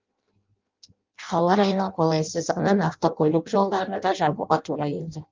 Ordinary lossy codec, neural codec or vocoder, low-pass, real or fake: Opus, 32 kbps; codec, 16 kHz in and 24 kHz out, 0.6 kbps, FireRedTTS-2 codec; 7.2 kHz; fake